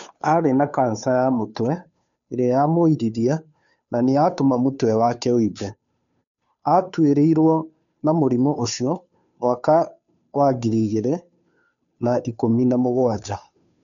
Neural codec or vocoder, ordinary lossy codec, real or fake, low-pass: codec, 16 kHz, 2 kbps, FunCodec, trained on Chinese and English, 25 frames a second; none; fake; 7.2 kHz